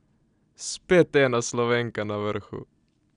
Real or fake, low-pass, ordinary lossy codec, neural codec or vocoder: real; 9.9 kHz; none; none